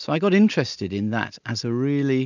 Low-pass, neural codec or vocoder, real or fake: 7.2 kHz; none; real